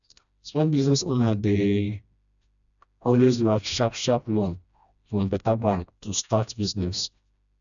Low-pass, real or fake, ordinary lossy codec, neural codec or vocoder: 7.2 kHz; fake; none; codec, 16 kHz, 1 kbps, FreqCodec, smaller model